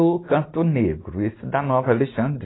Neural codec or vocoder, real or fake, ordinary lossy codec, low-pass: none; real; AAC, 16 kbps; 7.2 kHz